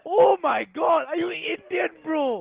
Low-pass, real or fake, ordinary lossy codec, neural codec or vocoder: 3.6 kHz; real; Opus, 16 kbps; none